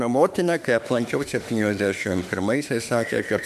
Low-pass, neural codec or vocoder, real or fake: 14.4 kHz; autoencoder, 48 kHz, 32 numbers a frame, DAC-VAE, trained on Japanese speech; fake